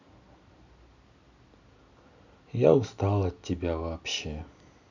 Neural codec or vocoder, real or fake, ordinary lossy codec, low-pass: none; real; none; 7.2 kHz